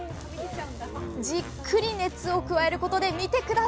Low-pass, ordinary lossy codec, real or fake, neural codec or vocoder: none; none; real; none